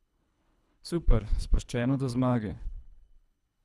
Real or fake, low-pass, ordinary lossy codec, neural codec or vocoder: fake; none; none; codec, 24 kHz, 3 kbps, HILCodec